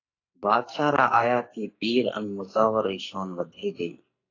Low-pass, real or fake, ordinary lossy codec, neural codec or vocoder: 7.2 kHz; fake; AAC, 32 kbps; codec, 44.1 kHz, 2.6 kbps, SNAC